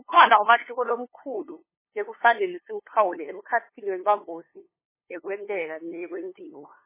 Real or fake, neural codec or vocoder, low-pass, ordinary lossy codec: fake; codec, 16 kHz, 2 kbps, FunCodec, trained on LibriTTS, 25 frames a second; 3.6 kHz; MP3, 16 kbps